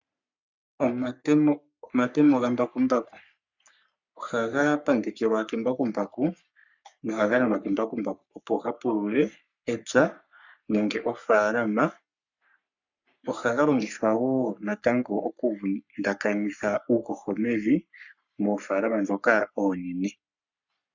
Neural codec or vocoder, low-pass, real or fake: codec, 44.1 kHz, 3.4 kbps, Pupu-Codec; 7.2 kHz; fake